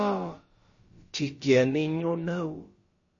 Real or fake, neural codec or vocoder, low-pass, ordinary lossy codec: fake; codec, 16 kHz, about 1 kbps, DyCAST, with the encoder's durations; 7.2 kHz; MP3, 32 kbps